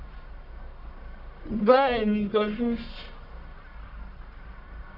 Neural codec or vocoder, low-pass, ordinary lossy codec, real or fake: codec, 44.1 kHz, 1.7 kbps, Pupu-Codec; 5.4 kHz; none; fake